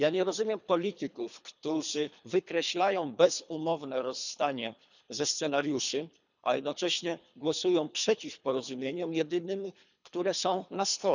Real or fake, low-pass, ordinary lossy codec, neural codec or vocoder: fake; 7.2 kHz; none; codec, 24 kHz, 3 kbps, HILCodec